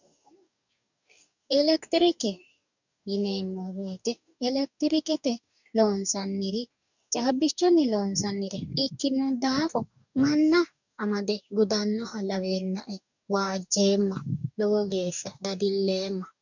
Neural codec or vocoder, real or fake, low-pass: codec, 44.1 kHz, 2.6 kbps, DAC; fake; 7.2 kHz